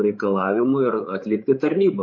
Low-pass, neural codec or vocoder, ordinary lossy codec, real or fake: 7.2 kHz; codec, 16 kHz, 8 kbps, FreqCodec, larger model; MP3, 32 kbps; fake